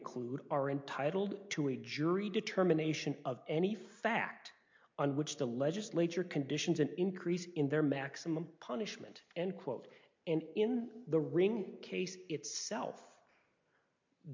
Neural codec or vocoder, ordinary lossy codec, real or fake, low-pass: none; MP3, 48 kbps; real; 7.2 kHz